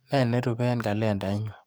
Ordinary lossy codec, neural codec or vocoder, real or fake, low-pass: none; codec, 44.1 kHz, 7.8 kbps, DAC; fake; none